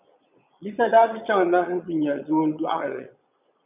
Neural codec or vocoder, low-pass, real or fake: vocoder, 22.05 kHz, 80 mel bands, Vocos; 3.6 kHz; fake